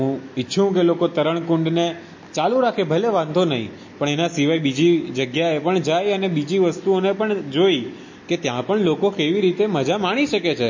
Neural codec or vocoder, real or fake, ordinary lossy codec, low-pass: none; real; MP3, 32 kbps; 7.2 kHz